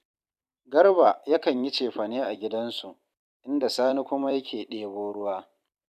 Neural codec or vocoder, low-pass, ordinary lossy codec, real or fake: vocoder, 44.1 kHz, 128 mel bands every 256 samples, BigVGAN v2; 14.4 kHz; none; fake